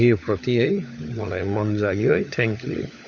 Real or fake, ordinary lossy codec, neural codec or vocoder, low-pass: fake; none; codec, 16 kHz, 16 kbps, FunCodec, trained on LibriTTS, 50 frames a second; 7.2 kHz